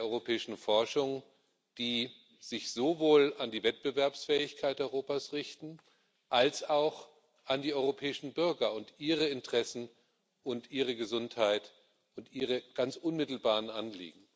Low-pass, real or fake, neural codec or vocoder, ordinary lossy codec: none; real; none; none